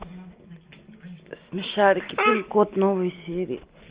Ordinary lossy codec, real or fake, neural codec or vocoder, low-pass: Opus, 32 kbps; real; none; 3.6 kHz